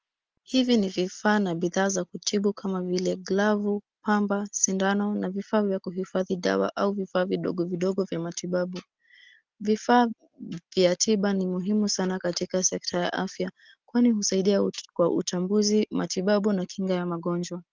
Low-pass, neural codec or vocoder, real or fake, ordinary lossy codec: 7.2 kHz; none; real; Opus, 24 kbps